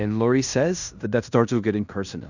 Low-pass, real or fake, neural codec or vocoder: 7.2 kHz; fake; codec, 16 kHz in and 24 kHz out, 0.9 kbps, LongCat-Audio-Codec, fine tuned four codebook decoder